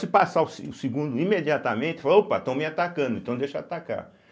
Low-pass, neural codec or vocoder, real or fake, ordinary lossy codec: none; none; real; none